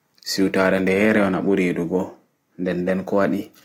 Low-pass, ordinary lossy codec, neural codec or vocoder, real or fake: 19.8 kHz; AAC, 48 kbps; none; real